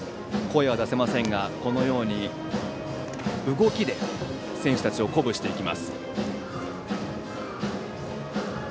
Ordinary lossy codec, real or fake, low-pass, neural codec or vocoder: none; real; none; none